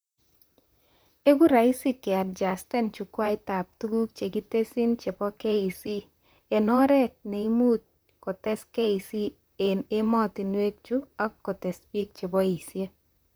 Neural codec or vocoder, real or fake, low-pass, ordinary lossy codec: vocoder, 44.1 kHz, 128 mel bands, Pupu-Vocoder; fake; none; none